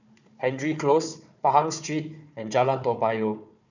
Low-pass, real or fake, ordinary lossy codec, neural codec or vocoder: 7.2 kHz; fake; none; codec, 16 kHz, 4 kbps, FunCodec, trained on Chinese and English, 50 frames a second